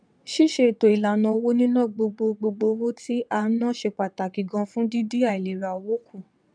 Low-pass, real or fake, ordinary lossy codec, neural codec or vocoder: 9.9 kHz; fake; none; vocoder, 22.05 kHz, 80 mel bands, WaveNeXt